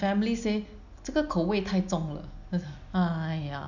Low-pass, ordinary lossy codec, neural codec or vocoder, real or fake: 7.2 kHz; none; none; real